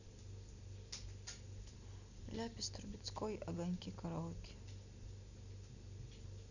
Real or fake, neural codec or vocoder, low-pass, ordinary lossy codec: real; none; 7.2 kHz; none